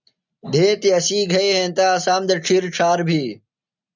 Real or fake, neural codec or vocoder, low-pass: real; none; 7.2 kHz